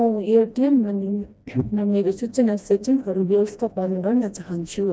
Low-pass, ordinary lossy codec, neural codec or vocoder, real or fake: none; none; codec, 16 kHz, 1 kbps, FreqCodec, smaller model; fake